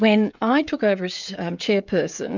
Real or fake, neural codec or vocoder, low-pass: fake; codec, 16 kHz, 16 kbps, FreqCodec, smaller model; 7.2 kHz